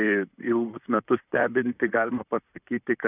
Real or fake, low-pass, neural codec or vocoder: fake; 3.6 kHz; vocoder, 44.1 kHz, 128 mel bands, Pupu-Vocoder